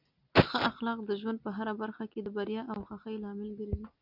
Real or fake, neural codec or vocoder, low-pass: real; none; 5.4 kHz